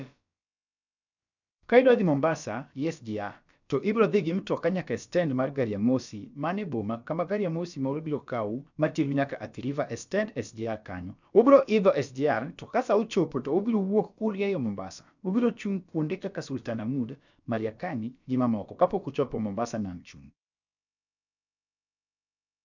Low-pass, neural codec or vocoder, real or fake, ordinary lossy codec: 7.2 kHz; codec, 16 kHz, about 1 kbps, DyCAST, with the encoder's durations; fake; none